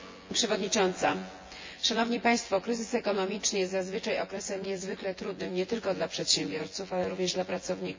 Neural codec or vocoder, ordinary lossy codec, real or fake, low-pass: vocoder, 24 kHz, 100 mel bands, Vocos; MP3, 32 kbps; fake; 7.2 kHz